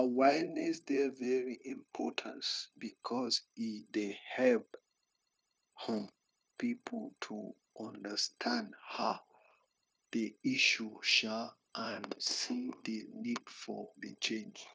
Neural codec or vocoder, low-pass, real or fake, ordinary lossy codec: codec, 16 kHz, 0.9 kbps, LongCat-Audio-Codec; none; fake; none